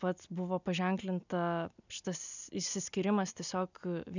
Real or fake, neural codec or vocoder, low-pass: real; none; 7.2 kHz